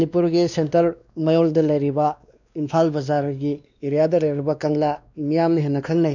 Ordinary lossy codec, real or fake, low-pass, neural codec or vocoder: none; fake; 7.2 kHz; codec, 16 kHz, 2 kbps, X-Codec, WavLM features, trained on Multilingual LibriSpeech